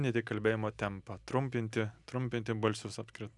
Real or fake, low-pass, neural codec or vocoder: real; 10.8 kHz; none